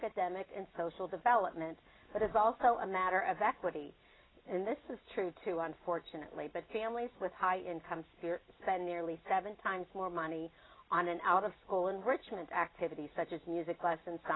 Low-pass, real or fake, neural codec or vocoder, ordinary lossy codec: 7.2 kHz; real; none; AAC, 16 kbps